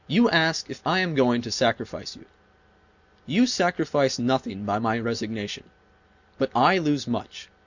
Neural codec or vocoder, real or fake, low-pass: none; real; 7.2 kHz